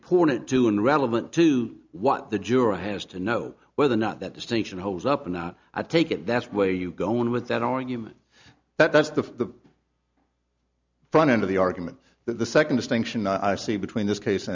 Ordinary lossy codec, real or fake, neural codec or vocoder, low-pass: MP3, 64 kbps; real; none; 7.2 kHz